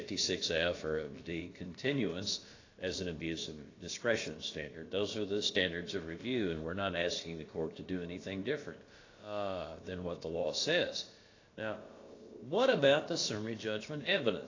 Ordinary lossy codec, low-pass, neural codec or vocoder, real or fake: AAC, 32 kbps; 7.2 kHz; codec, 16 kHz, about 1 kbps, DyCAST, with the encoder's durations; fake